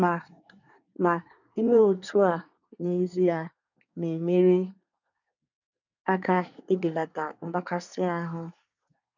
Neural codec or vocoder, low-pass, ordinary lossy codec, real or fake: codec, 24 kHz, 1 kbps, SNAC; 7.2 kHz; none; fake